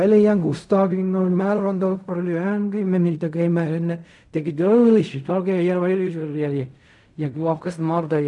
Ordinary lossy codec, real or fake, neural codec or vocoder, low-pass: none; fake; codec, 16 kHz in and 24 kHz out, 0.4 kbps, LongCat-Audio-Codec, fine tuned four codebook decoder; 10.8 kHz